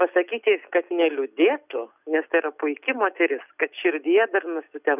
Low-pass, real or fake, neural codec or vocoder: 3.6 kHz; real; none